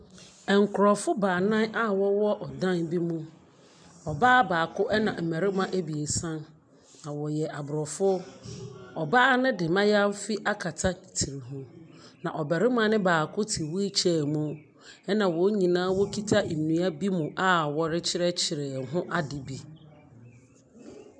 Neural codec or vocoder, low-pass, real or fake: none; 9.9 kHz; real